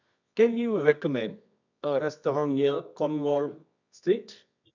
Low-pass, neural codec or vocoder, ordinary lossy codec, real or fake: 7.2 kHz; codec, 24 kHz, 0.9 kbps, WavTokenizer, medium music audio release; none; fake